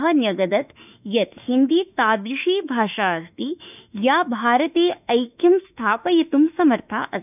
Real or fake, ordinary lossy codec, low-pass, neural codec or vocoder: fake; none; 3.6 kHz; autoencoder, 48 kHz, 32 numbers a frame, DAC-VAE, trained on Japanese speech